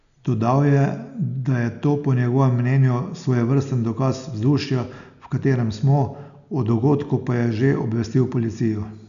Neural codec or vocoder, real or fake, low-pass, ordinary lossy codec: none; real; 7.2 kHz; none